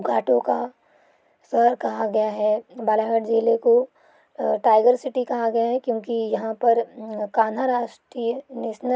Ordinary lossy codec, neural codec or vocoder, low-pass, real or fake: none; none; none; real